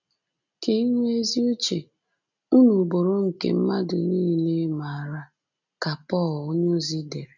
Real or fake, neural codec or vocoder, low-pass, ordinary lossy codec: real; none; 7.2 kHz; none